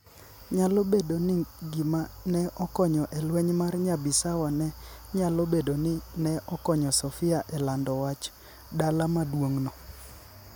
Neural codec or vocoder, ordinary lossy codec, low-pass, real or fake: none; none; none; real